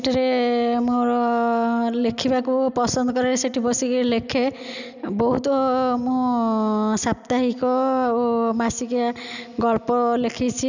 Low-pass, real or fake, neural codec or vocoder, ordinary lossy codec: 7.2 kHz; real; none; none